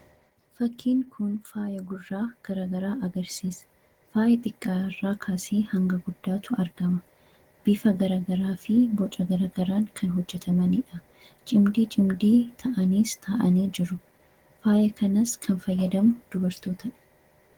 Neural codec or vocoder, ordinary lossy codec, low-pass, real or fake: none; Opus, 16 kbps; 19.8 kHz; real